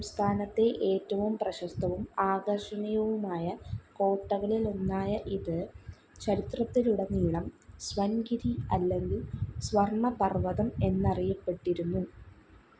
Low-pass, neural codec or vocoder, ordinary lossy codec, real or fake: none; none; none; real